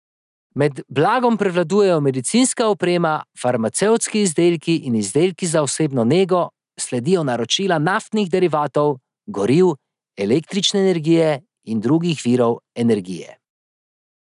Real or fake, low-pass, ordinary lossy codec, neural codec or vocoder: real; 10.8 kHz; none; none